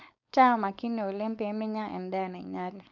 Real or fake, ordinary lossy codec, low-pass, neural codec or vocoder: fake; none; 7.2 kHz; codec, 16 kHz, 4.8 kbps, FACodec